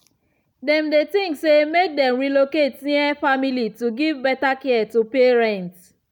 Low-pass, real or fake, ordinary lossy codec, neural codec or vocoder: 19.8 kHz; real; none; none